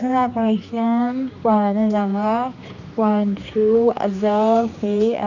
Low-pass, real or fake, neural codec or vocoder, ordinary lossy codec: 7.2 kHz; fake; codec, 16 kHz, 2 kbps, X-Codec, HuBERT features, trained on general audio; none